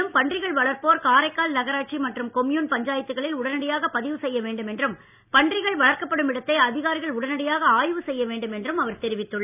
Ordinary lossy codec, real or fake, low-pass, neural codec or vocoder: none; real; 3.6 kHz; none